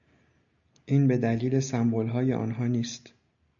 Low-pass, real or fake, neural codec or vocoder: 7.2 kHz; real; none